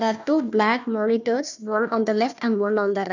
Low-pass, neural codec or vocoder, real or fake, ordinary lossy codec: 7.2 kHz; codec, 16 kHz, 1 kbps, FunCodec, trained on Chinese and English, 50 frames a second; fake; none